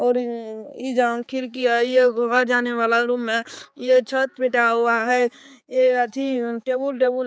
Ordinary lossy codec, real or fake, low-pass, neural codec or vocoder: none; fake; none; codec, 16 kHz, 4 kbps, X-Codec, HuBERT features, trained on balanced general audio